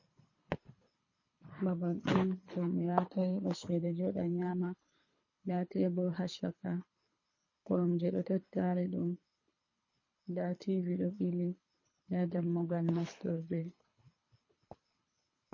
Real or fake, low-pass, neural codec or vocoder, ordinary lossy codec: fake; 7.2 kHz; codec, 24 kHz, 3 kbps, HILCodec; MP3, 32 kbps